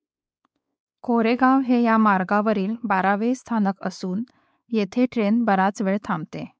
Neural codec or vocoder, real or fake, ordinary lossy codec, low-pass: codec, 16 kHz, 4 kbps, X-Codec, WavLM features, trained on Multilingual LibriSpeech; fake; none; none